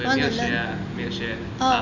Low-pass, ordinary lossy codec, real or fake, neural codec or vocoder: 7.2 kHz; none; real; none